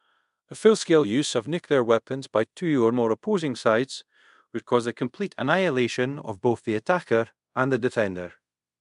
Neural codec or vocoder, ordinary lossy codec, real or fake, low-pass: codec, 24 kHz, 0.5 kbps, DualCodec; MP3, 64 kbps; fake; 10.8 kHz